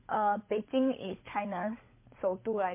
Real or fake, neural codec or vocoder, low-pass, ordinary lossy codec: fake; codec, 16 kHz, 16 kbps, FunCodec, trained on LibriTTS, 50 frames a second; 3.6 kHz; MP3, 24 kbps